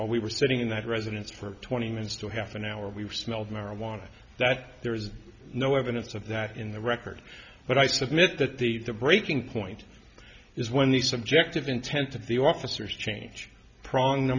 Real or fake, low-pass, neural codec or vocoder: real; 7.2 kHz; none